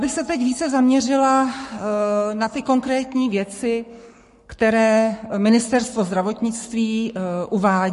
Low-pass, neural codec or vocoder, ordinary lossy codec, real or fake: 14.4 kHz; codec, 44.1 kHz, 7.8 kbps, Pupu-Codec; MP3, 48 kbps; fake